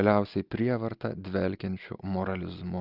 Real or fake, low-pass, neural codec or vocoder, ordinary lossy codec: real; 5.4 kHz; none; Opus, 24 kbps